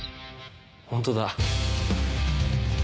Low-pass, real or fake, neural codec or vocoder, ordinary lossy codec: none; real; none; none